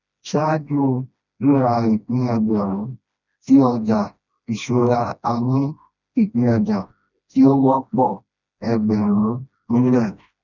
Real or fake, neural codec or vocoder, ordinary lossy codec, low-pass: fake; codec, 16 kHz, 1 kbps, FreqCodec, smaller model; none; 7.2 kHz